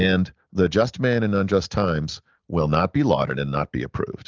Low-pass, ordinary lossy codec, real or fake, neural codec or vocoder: 7.2 kHz; Opus, 24 kbps; real; none